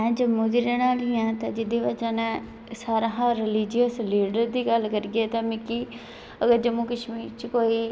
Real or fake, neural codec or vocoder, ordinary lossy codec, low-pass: real; none; none; none